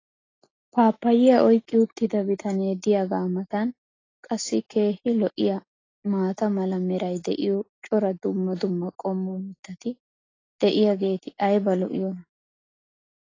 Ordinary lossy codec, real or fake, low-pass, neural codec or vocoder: AAC, 32 kbps; real; 7.2 kHz; none